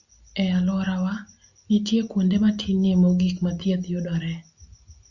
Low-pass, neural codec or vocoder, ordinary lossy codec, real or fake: 7.2 kHz; none; Opus, 64 kbps; real